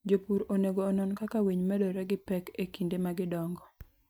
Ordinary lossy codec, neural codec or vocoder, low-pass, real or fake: none; none; none; real